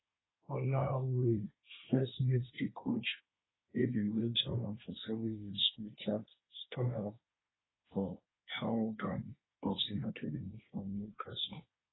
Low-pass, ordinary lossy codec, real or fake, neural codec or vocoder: 7.2 kHz; AAC, 16 kbps; fake; codec, 24 kHz, 1 kbps, SNAC